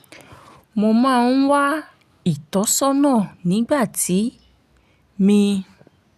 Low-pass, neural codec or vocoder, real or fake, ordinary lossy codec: 14.4 kHz; none; real; none